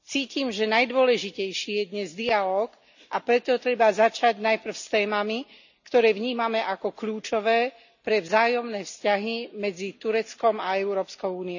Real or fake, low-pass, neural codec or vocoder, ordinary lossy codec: real; 7.2 kHz; none; none